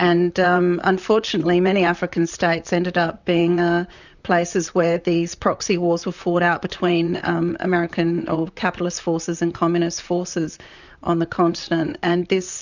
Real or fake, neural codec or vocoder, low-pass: fake; vocoder, 44.1 kHz, 128 mel bands, Pupu-Vocoder; 7.2 kHz